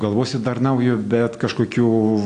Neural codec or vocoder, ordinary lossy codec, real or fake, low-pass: none; AAC, 48 kbps; real; 9.9 kHz